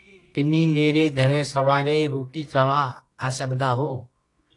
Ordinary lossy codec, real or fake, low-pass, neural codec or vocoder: AAC, 48 kbps; fake; 10.8 kHz; codec, 24 kHz, 0.9 kbps, WavTokenizer, medium music audio release